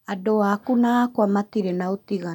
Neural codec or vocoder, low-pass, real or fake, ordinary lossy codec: none; 19.8 kHz; real; none